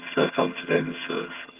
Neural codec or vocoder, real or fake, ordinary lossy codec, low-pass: vocoder, 22.05 kHz, 80 mel bands, HiFi-GAN; fake; Opus, 32 kbps; 3.6 kHz